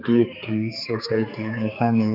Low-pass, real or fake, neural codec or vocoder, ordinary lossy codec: 5.4 kHz; fake; codec, 16 kHz, 4 kbps, X-Codec, HuBERT features, trained on general audio; none